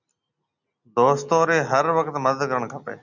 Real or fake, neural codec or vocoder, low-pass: real; none; 7.2 kHz